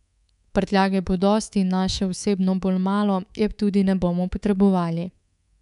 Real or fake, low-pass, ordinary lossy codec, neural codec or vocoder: fake; 10.8 kHz; none; codec, 24 kHz, 3.1 kbps, DualCodec